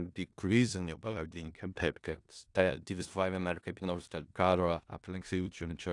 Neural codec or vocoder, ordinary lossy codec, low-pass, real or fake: codec, 16 kHz in and 24 kHz out, 0.4 kbps, LongCat-Audio-Codec, four codebook decoder; AAC, 64 kbps; 10.8 kHz; fake